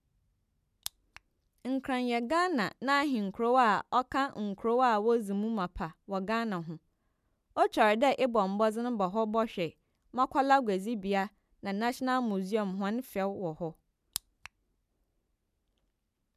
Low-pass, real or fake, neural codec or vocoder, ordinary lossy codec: 14.4 kHz; real; none; none